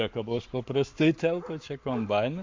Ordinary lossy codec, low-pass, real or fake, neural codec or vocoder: MP3, 48 kbps; 7.2 kHz; fake; codec, 44.1 kHz, 7.8 kbps, Pupu-Codec